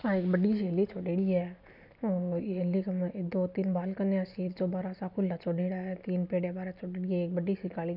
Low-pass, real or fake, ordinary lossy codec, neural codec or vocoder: 5.4 kHz; real; none; none